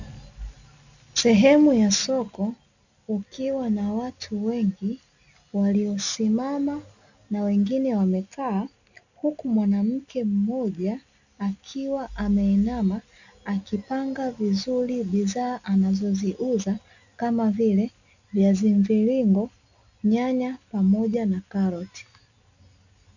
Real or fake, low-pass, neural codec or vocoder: real; 7.2 kHz; none